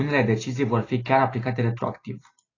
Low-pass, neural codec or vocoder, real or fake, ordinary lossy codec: 7.2 kHz; none; real; AAC, 32 kbps